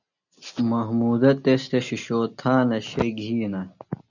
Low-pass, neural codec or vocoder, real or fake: 7.2 kHz; none; real